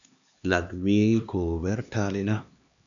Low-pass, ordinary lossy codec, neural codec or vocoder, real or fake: 7.2 kHz; MP3, 96 kbps; codec, 16 kHz, 2 kbps, X-Codec, HuBERT features, trained on LibriSpeech; fake